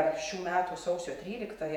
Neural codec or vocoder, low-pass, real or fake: vocoder, 48 kHz, 128 mel bands, Vocos; 19.8 kHz; fake